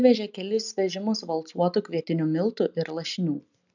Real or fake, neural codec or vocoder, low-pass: real; none; 7.2 kHz